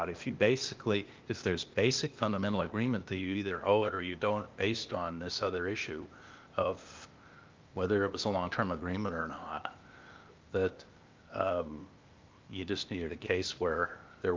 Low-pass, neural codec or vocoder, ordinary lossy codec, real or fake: 7.2 kHz; codec, 16 kHz, 0.8 kbps, ZipCodec; Opus, 24 kbps; fake